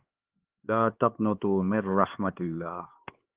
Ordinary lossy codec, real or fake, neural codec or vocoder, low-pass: Opus, 16 kbps; fake; codec, 16 kHz, 4 kbps, X-Codec, HuBERT features, trained on LibriSpeech; 3.6 kHz